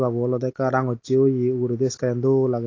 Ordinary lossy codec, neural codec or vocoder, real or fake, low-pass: AAC, 32 kbps; none; real; 7.2 kHz